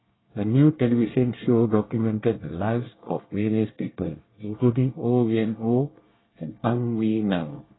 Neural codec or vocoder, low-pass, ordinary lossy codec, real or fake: codec, 24 kHz, 1 kbps, SNAC; 7.2 kHz; AAC, 16 kbps; fake